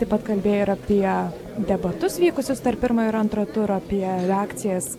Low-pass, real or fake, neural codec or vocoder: 19.8 kHz; real; none